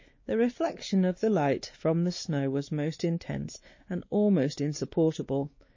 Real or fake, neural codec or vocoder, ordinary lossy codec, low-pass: fake; codec, 16 kHz, 2 kbps, X-Codec, WavLM features, trained on Multilingual LibriSpeech; MP3, 32 kbps; 7.2 kHz